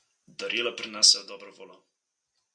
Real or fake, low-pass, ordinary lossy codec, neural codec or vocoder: real; 9.9 kHz; AAC, 64 kbps; none